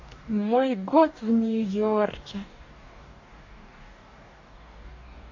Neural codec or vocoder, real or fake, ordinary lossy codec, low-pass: codec, 44.1 kHz, 2.6 kbps, DAC; fake; AAC, 48 kbps; 7.2 kHz